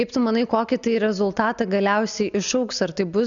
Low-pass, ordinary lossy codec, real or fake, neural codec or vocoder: 7.2 kHz; MP3, 96 kbps; real; none